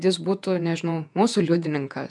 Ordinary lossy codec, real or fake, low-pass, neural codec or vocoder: MP3, 96 kbps; fake; 10.8 kHz; vocoder, 48 kHz, 128 mel bands, Vocos